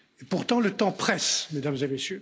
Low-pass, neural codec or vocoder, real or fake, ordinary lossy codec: none; none; real; none